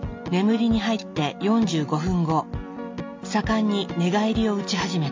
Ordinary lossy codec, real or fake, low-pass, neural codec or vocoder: MP3, 48 kbps; real; 7.2 kHz; none